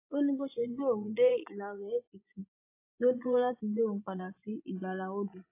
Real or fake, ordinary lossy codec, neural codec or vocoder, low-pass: fake; AAC, 24 kbps; vocoder, 24 kHz, 100 mel bands, Vocos; 3.6 kHz